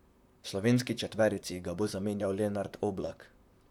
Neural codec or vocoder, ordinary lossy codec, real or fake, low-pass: vocoder, 44.1 kHz, 128 mel bands, Pupu-Vocoder; none; fake; 19.8 kHz